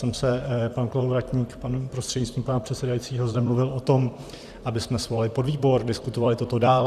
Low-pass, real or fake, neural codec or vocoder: 14.4 kHz; fake; vocoder, 44.1 kHz, 128 mel bands, Pupu-Vocoder